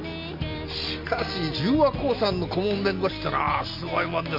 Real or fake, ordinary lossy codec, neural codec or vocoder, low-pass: real; MP3, 48 kbps; none; 5.4 kHz